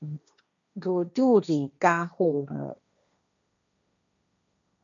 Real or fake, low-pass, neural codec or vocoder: fake; 7.2 kHz; codec, 16 kHz, 1.1 kbps, Voila-Tokenizer